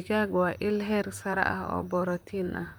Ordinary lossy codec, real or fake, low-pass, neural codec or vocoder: none; fake; none; vocoder, 44.1 kHz, 128 mel bands, Pupu-Vocoder